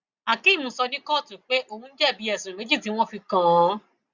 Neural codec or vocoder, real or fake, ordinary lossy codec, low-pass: none; real; Opus, 64 kbps; 7.2 kHz